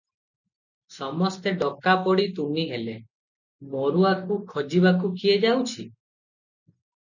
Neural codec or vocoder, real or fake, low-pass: none; real; 7.2 kHz